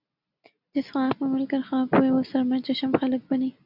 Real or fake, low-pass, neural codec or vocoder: real; 5.4 kHz; none